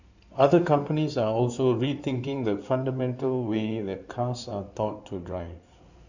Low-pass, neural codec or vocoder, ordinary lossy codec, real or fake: 7.2 kHz; codec, 16 kHz in and 24 kHz out, 2.2 kbps, FireRedTTS-2 codec; none; fake